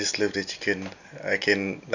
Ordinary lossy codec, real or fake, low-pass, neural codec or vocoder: none; real; 7.2 kHz; none